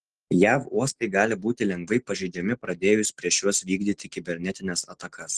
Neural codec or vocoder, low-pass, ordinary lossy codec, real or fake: none; 10.8 kHz; Opus, 16 kbps; real